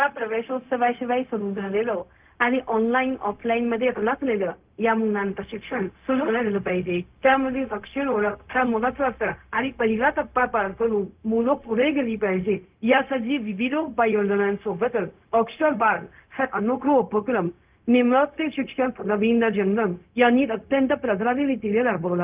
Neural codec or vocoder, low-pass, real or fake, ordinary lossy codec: codec, 16 kHz, 0.4 kbps, LongCat-Audio-Codec; 3.6 kHz; fake; Opus, 24 kbps